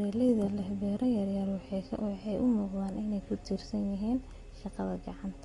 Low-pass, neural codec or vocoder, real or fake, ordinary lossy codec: 10.8 kHz; none; real; AAC, 32 kbps